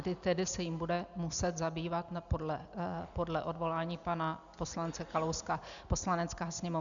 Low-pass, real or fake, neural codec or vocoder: 7.2 kHz; real; none